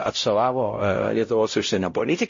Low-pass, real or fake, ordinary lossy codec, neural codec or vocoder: 7.2 kHz; fake; MP3, 32 kbps; codec, 16 kHz, 0.5 kbps, X-Codec, WavLM features, trained on Multilingual LibriSpeech